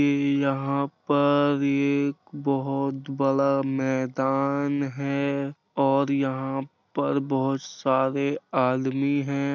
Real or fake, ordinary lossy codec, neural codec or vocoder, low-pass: real; none; none; 7.2 kHz